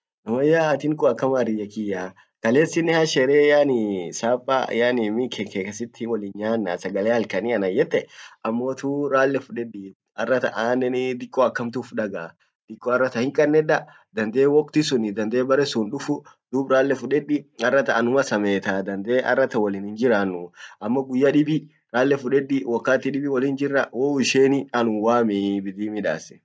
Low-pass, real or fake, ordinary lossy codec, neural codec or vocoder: none; real; none; none